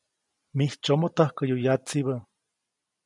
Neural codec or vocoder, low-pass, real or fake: none; 10.8 kHz; real